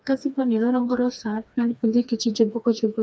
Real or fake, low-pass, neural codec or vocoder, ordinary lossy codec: fake; none; codec, 16 kHz, 2 kbps, FreqCodec, smaller model; none